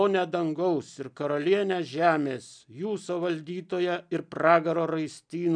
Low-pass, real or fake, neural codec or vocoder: 9.9 kHz; real; none